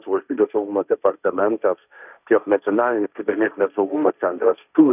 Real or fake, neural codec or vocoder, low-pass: fake; codec, 16 kHz, 1.1 kbps, Voila-Tokenizer; 3.6 kHz